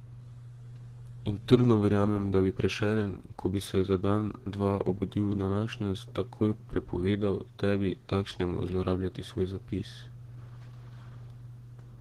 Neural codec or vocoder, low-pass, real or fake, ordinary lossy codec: codec, 32 kHz, 1.9 kbps, SNAC; 14.4 kHz; fake; Opus, 16 kbps